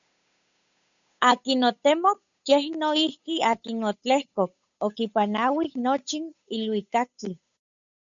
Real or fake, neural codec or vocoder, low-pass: fake; codec, 16 kHz, 8 kbps, FunCodec, trained on Chinese and English, 25 frames a second; 7.2 kHz